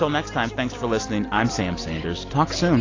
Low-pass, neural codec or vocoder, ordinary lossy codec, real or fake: 7.2 kHz; none; AAC, 32 kbps; real